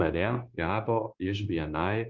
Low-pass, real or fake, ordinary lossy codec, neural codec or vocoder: 7.2 kHz; fake; Opus, 32 kbps; codec, 16 kHz, 0.9 kbps, LongCat-Audio-Codec